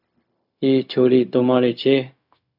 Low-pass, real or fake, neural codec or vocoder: 5.4 kHz; fake; codec, 16 kHz, 0.4 kbps, LongCat-Audio-Codec